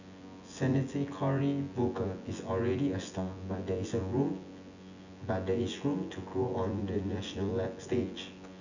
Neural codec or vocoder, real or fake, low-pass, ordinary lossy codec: vocoder, 24 kHz, 100 mel bands, Vocos; fake; 7.2 kHz; none